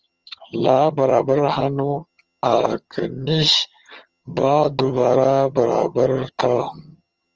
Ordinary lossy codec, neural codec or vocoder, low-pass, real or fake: Opus, 24 kbps; vocoder, 22.05 kHz, 80 mel bands, HiFi-GAN; 7.2 kHz; fake